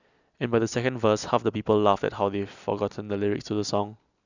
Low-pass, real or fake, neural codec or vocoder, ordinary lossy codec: 7.2 kHz; real; none; none